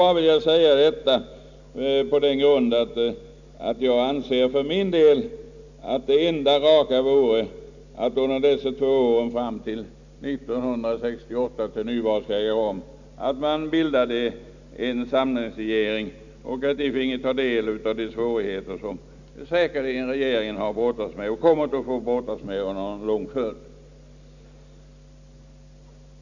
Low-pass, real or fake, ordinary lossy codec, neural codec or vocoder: 7.2 kHz; real; none; none